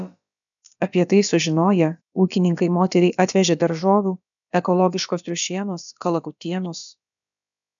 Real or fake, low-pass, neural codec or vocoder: fake; 7.2 kHz; codec, 16 kHz, about 1 kbps, DyCAST, with the encoder's durations